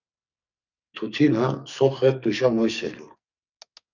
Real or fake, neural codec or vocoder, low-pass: fake; codec, 44.1 kHz, 2.6 kbps, SNAC; 7.2 kHz